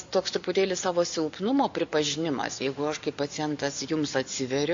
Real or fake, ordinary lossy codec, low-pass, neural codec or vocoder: real; AAC, 64 kbps; 7.2 kHz; none